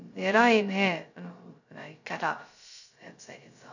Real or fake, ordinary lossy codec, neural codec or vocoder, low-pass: fake; none; codec, 16 kHz, 0.2 kbps, FocalCodec; 7.2 kHz